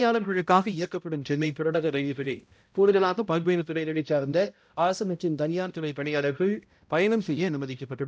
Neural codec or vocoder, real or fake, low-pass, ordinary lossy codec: codec, 16 kHz, 0.5 kbps, X-Codec, HuBERT features, trained on balanced general audio; fake; none; none